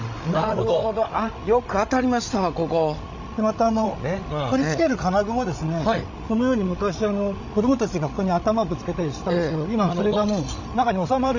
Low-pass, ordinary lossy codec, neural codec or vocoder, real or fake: 7.2 kHz; none; codec, 16 kHz, 16 kbps, FreqCodec, larger model; fake